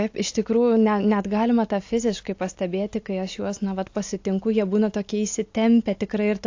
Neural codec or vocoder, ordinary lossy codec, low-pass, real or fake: none; AAC, 48 kbps; 7.2 kHz; real